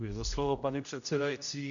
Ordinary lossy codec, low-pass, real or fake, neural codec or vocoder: MP3, 96 kbps; 7.2 kHz; fake; codec, 16 kHz, 0.5 kbps, X-Codec, HuBERT features, trained on general audio